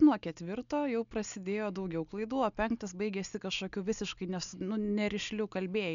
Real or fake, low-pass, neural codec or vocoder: real; 7.2 kHz; none